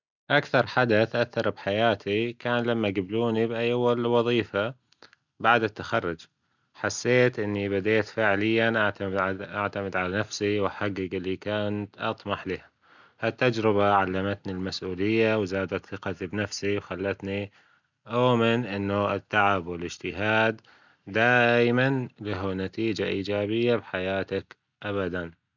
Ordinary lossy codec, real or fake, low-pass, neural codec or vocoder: none; real; 7.2 kHz; none